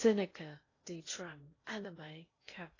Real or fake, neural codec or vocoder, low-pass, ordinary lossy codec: fake; codec, 16 kHz in and 24 kHz out, 0.6 kbps, FocalCodec, streaming, 2048 codes; 7.2 kHz; AAC, 32 kbps